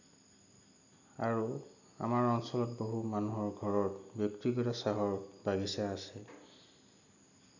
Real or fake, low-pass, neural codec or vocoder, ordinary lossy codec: real; 7.2 kHz; none; none